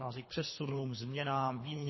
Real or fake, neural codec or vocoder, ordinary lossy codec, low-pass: fake; codec, 24 kHz, 3 kbps, HILCodec; MP3, 24 kbps; 7.2 kHz